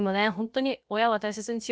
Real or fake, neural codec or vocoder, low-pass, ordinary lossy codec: fake; codec, 16 kHz, about 1 kbps, DyCAST, with the encoder's durations; none; none